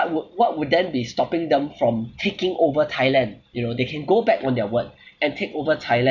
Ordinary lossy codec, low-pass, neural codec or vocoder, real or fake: none; 7.2 kHz; none; real